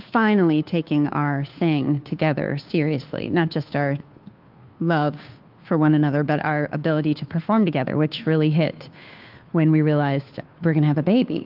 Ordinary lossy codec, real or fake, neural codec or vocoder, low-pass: Opus, 32 kbps; fake; codec, 16 kHz, 2 kbps, FunCodec, trained on Chinese and English, 25 frames a second; 5.4 kHz